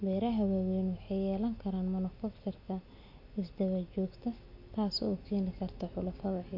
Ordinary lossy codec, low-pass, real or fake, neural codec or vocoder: AAC, 48 kbps; 5.4 kHz; real; none